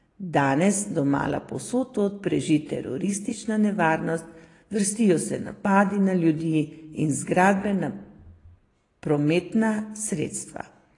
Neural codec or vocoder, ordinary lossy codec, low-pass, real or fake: none; AAC, 32 kbps; 10.8 kHz; real